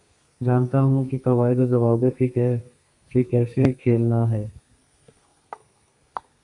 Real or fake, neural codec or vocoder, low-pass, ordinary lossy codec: fake; codec, 32 kHz, 1.9 kbps, SNAC; 10.8 kHz; AAC, 64 kbps